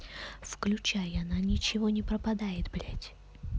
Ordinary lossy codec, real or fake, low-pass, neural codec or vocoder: none; real; none; none